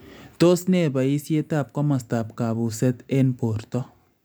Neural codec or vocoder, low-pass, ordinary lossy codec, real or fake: none; none; none; real